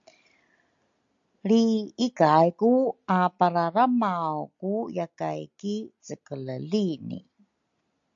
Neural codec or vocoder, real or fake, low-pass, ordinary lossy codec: none; real; 7.2 kHz; AAC, 64 kbps